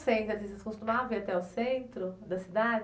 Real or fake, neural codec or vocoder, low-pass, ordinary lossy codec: real; none; none; none